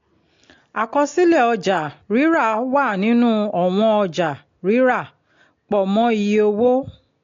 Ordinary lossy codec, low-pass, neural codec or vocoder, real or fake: AAC, 48 kbps; 7.2 kHz; none; real